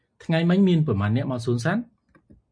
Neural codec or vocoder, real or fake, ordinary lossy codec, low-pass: none; real; MP3, 48 kbps; 9.9 kHz